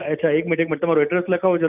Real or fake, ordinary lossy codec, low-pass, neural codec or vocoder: real; none; 3.6 kHz; none